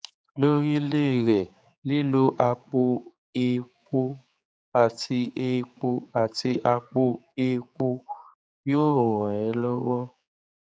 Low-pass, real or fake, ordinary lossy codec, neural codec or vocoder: none; fake; none; codec, 16 kHz, 4 kbps, X-Codec, HuBERT features, trained on general audio